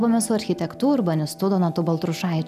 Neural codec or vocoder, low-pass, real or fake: none; 14.4 kHz; real